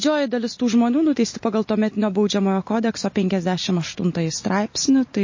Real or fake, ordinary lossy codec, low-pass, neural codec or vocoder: real; MP3, 32 kbps; 7.2 kHz; none